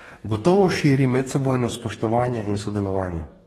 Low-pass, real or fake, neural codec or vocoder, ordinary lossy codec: 19.8 kHz; fake; codec, 44.1 kHz, 2.6 kbps, DAC; AAC, 32 kbps